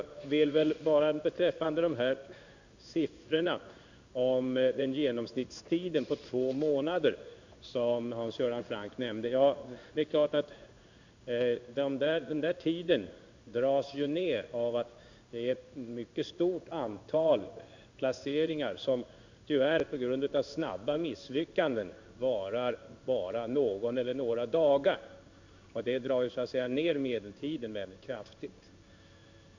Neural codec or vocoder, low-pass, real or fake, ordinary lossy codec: codec, 16 kHz in and 24 kHz out, 1 kbps, XY-Tokenizer; 7.2 kHz; fake; none